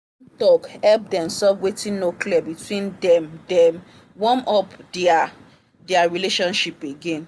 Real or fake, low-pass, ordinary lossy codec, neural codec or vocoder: real; none; none; none